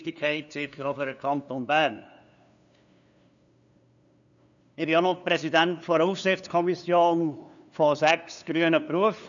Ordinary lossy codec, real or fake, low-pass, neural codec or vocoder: none; fake; 7.2 kHz; codec, 16 kHz, 2 kbps, FunCodec, trained on LibriTTS, 25 frames a second